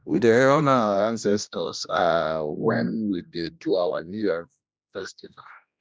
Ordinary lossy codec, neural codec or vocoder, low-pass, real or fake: none; codec, 16 kHz, 1 kbps, X-Codec, HuBERT features, trained on general audio; none; fake